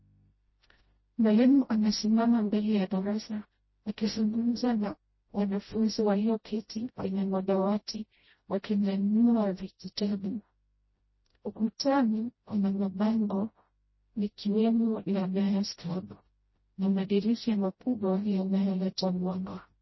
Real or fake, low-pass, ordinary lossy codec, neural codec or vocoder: fake; 7.2 kHz; MP3, 24 kbps; codec, 16 kHz, 0.5 kbps, FreqCodec, smaller model